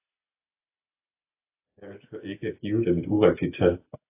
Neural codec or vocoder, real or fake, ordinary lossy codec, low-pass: none; real; AAC, 24 kbps; 3.6 kHz